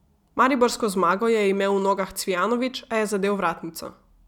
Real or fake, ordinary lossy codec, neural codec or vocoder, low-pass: real; none; none; 19.8 kHz